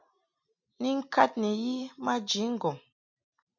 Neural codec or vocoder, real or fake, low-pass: none; real; 7.2 kHz